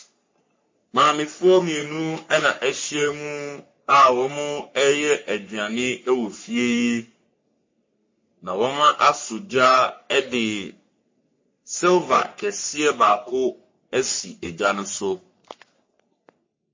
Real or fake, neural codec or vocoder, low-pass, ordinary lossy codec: fake; codec, 44.1 kHz, 3.4 kbps, Pupu-Codec; 7.2 kHz; MP3, 32 kbps